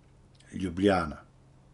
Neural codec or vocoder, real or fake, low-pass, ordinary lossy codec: none; real; 10.8 kHz; none